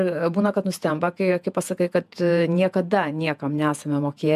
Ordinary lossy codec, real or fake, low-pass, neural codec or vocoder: MP3, 96 kbps; fake; 14.4 kHz; vocoder, 48 kHz, 128 mel bands, Vocos